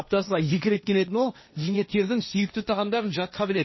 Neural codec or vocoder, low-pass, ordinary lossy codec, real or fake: codec, 16 kHz, 1.1 kbps, Voila-Tokenizer; 7.2 kHz; MP3, 24 kbps; fake